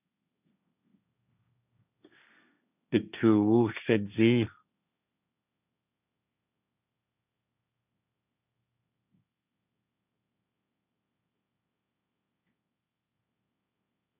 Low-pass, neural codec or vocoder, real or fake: 3.6 kHz; codec, 16 kHz, 1.1 kbps, Voila-Tokenizer; fake